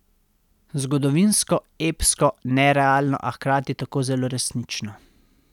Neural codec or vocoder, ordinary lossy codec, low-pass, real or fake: none; none; 19.8 kHz; real